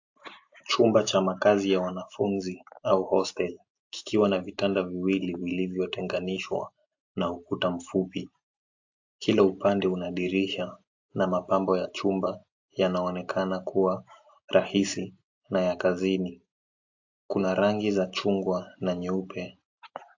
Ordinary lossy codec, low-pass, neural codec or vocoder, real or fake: AAC, 48 kbps; 7.2 kHz; none; real